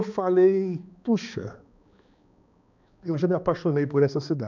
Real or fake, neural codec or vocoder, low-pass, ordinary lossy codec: fake; codec, 16 kHz, 2 kbps, X-Codec, HuBERT features, trained on balanced general audio; 7.2 kHz; none